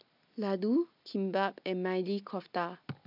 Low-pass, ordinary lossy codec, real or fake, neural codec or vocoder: 5.4 kHz; none; real; none